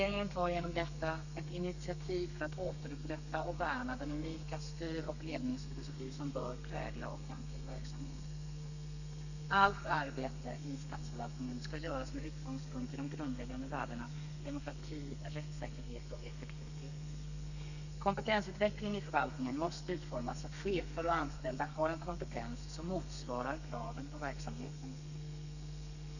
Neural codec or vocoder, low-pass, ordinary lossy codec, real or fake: codec, 32 kHz, 1.9 kbps, SNAC; 7.2 kHz; none; fake